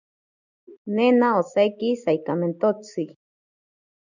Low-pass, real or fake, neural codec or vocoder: 7.2 kHz; real; none